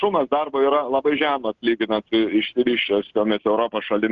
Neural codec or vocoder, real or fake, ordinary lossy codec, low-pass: none; real; Opus, 24 kbps; 7.2 kHz